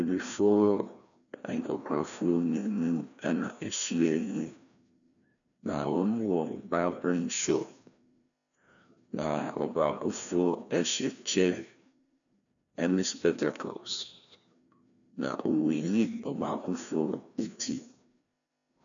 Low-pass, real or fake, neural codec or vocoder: 7.2 kHz; fake; codec, 16 kHz, 1 kbps, FreqCodec, larger model